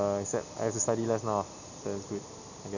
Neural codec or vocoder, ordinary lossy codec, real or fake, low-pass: none; none; real; 7.2 kHz